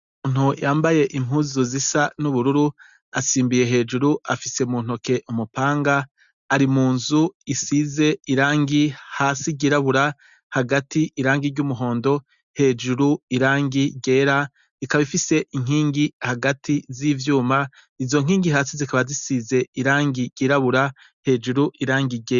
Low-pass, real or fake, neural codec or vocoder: 7.2 kHz; real; none